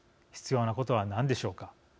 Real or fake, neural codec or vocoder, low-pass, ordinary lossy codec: real; none; none; none